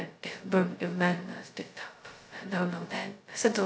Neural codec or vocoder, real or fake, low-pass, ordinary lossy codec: codec, 16 kHz, 0.2 kbps, FocalCodec; fake; none; none